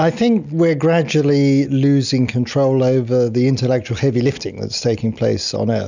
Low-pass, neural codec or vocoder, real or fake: 7.2 kHz; none; real